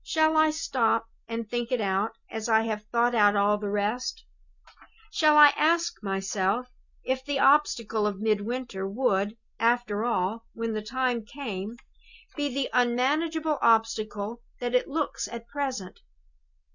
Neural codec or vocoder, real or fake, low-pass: none; real; 7.2 kHz